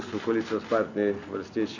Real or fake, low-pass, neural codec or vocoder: real; 7.2 kHz; none